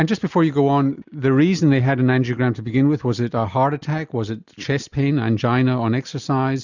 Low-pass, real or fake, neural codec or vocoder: 7.2 kHz; real; none